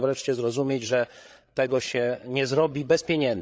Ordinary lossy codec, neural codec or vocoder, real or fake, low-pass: none; codec, 16 kHz, 8 kbps, FreqCodec, larger model; fake; none